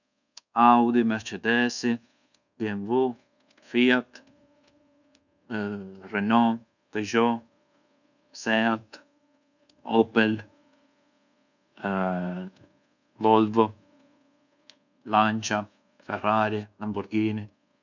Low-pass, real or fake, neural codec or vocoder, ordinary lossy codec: 7.2 kHz; fake; codec, 24 kHz, 1.2 kbps, DualCodec; none